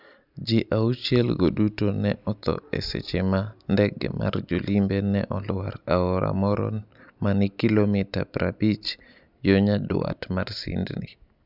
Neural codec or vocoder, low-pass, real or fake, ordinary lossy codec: none; 5.4 kHz; real; none